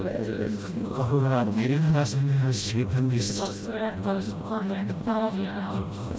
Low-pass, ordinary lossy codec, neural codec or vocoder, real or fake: none; none; codec, 16 kHz, 0.5 kbps, FreqCodec, smaller model; fake